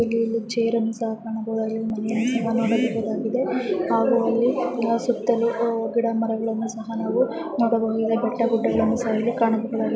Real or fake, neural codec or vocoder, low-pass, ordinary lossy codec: real; none; none; none